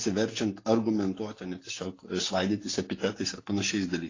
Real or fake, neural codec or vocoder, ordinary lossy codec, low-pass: real; none; AAC, 32 kbps; 7.2 kHz